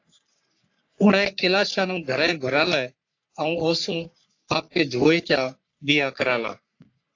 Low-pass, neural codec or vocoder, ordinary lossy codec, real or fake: 7.2 kHz; codec, 44.1 kHz, 3.4 kbps, Pupu-Codec; AAC, 48 kbps; fake